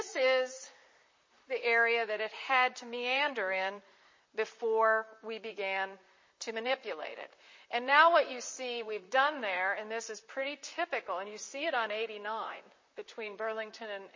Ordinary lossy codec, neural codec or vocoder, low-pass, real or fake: MP3, 32 kbps; vocoder, 44.1 kHz, 128 mel bands, Pupu-Vocoder; 7.2 kHz; fake